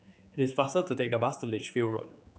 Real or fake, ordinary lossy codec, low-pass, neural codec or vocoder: fake; none; none; codec, 16 kHz, 4 kbps, X-Codec, HuBERT features, trained on general audio